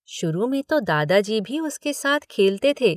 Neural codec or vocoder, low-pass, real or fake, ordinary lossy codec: none; 14.4 kHz; real; none